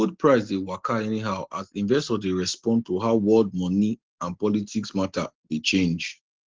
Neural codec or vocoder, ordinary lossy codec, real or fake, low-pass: none; Opus, 16 kbps; real; 7.2 kHz